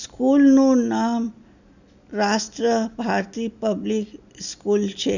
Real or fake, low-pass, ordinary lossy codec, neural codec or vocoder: real; 7.2 kHz; none; none